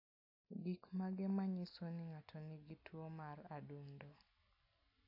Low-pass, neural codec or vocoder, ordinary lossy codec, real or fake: 5.4 kHz; none; AAC, 32 kbps; real